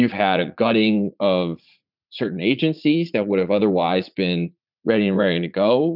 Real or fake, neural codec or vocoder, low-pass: fake; vocoder, 44.1 kHz, 80 mel bands, Vocos; 5.4 kHz